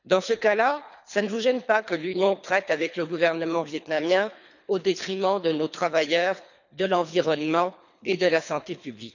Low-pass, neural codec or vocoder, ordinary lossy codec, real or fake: 7.2 kHz; codec, 24 kHz, 3 kbps, HILCodec; none; fake